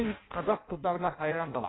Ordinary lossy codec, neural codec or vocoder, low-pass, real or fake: AAC, 16 kbps; codec, 16 kHz in and 24 kHz out, 0.6 kbps, FireRedTTS-2 codec; 7.2 kHz; fake